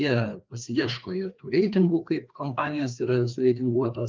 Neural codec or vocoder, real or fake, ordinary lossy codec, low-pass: codec, 16 kHz, 2 kbps, FreqCodec, larger model; fake; Opus, 24 kbps; 7.2 kHz